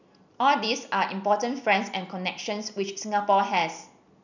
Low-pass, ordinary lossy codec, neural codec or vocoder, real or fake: 7.2 kHz; none; none; real